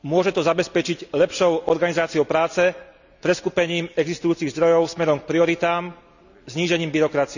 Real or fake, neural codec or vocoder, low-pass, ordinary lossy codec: real; none; 7.2 kHz; none